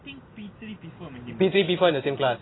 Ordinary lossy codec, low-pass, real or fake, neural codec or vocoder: AAC, 16 kbps; 7.2 kHz; real; none